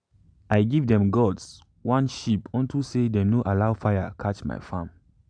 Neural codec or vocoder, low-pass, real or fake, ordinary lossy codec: none; 9.9 kHz; real; none